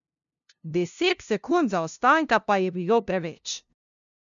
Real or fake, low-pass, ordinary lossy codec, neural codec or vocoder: fake; 7.2 kHz; none; codec, 16 kHz, 0.5 kbps, FunCodec, trained on LibriTTS, 25 frames a second